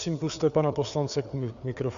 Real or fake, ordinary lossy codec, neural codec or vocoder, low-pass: fake; Opus, 64 kbps; codec, 16 kHz, 4 kbps, FreqCodec, larger model; 7.2 kHz